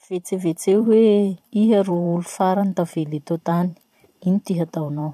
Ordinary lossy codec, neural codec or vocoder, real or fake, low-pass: AAC, 96 kbps; vocoder, 44.1 kHz, 128 mel bands every 512 samples, BigVGAN v2; fake; 14.4 kHz